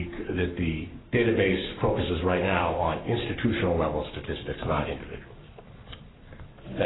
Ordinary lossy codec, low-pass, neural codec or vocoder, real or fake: AAC, 16 kbps; 7.2 kHz; codec, 44.1 kHz, 7.8 kbps, Pupu-Codec; fake